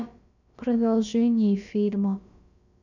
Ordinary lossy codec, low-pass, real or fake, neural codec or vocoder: none; 7.2 kHz; fake; codec, 16 kHz, about 1 kbps, DyCAST, with the encoder's durations